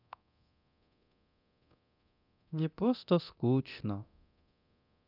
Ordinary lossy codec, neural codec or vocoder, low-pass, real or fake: none; codec, 24 kHz, 0.9 kbps, DualCodec; 5.4 kHz; fake